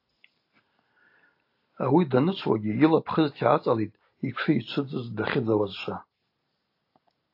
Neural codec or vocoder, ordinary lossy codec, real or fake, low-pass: none; AAC, 32 kbps; real; 5.4 kHz